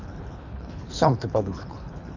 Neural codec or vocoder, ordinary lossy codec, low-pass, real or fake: codec, 24 kHz, 3 kbps, HILCodec; none; 7.2 kHz; fake